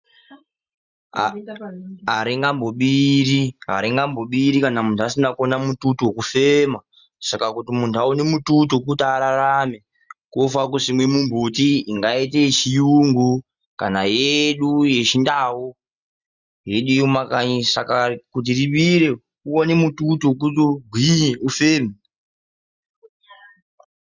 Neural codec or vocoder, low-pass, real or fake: none; 7.2 kHz; real